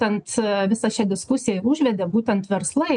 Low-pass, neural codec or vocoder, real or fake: 9.9 kHz; none; real